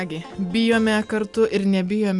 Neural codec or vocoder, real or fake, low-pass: none; real; 10.8 kHz